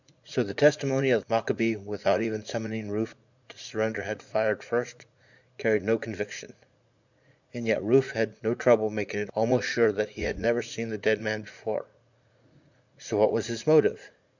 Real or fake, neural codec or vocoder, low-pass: fake; vocoder, 44.1 kHz, 80 mel bands, Vocos; 7.2 kHz